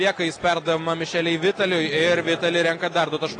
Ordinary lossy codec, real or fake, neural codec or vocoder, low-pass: AAC, 32 kbps; real; none; 9.9 kHz